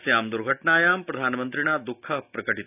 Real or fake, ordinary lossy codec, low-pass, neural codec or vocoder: real; none; 3.6 kHz; none